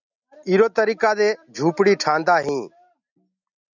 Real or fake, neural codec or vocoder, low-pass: real; none; 7.2 kHz